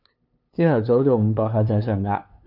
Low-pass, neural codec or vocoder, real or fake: 5.4 kHz; codec, 16 kHz, 2 kbps, FunCodec, trained on LibriTTS, 25 frames a second; fake